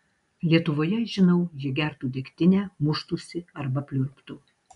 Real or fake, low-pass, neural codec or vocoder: real; 10.8 kHz; none